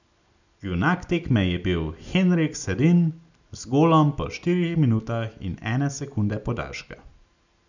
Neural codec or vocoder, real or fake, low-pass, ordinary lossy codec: none; real; 7.2 kHz; none